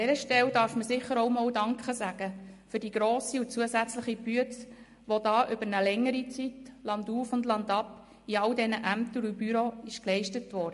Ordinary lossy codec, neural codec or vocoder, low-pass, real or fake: MP3, 48 kbps; none; 14.4 kHz; real